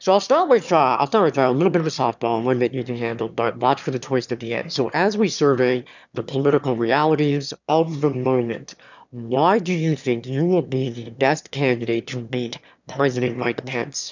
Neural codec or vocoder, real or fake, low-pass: autoencoder, 22.05 kHz, a latent of 192 numbers a frame, VITS, trained on one speaker; fake; 7.2 kHz